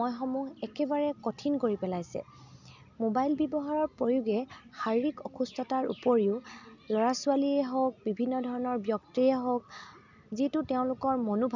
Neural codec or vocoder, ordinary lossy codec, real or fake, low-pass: none; none; real; 7.2 kHz